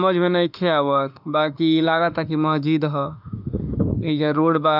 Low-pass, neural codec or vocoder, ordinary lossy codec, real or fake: 5.4 kHz; autoencoder, 48 kHz, 32 numbers a frame, DAC-VAE, trained on Japanese speech; none; fake